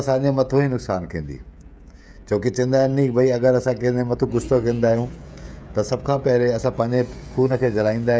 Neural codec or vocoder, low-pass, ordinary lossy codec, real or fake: codec, 16 kHz, 16 kbps, FreqCodec, smaller model; none; none; fake